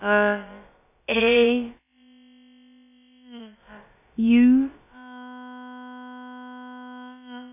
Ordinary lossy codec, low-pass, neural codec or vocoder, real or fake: none; 3.6 kHz; codec, 16 kHz, about 1 kbps, DyCAST, with the encoder's durations; fake